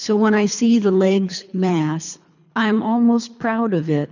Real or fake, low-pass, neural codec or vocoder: fake; 7.2 kHz; codec, 24 kHz, 3 kbps, HILCodec